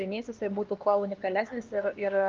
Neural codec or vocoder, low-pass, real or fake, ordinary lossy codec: codec, 16 kHz, 2 kbps, X-Codec, HuBERT features, trained on LibriSpeech; 7.2 kHz; fake; Opus, 24 kbps